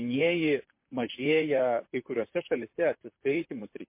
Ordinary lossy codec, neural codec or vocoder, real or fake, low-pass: MP3, 24 kbps; vocoder, 44.1 kHz, 128 mel bands, Pupu-Vocoder; fake; 3.6 kHz